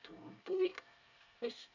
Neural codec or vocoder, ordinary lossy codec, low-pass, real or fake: codec, 24 kHz, 1 kbps, SNAC; none; 7.2 kHz; fake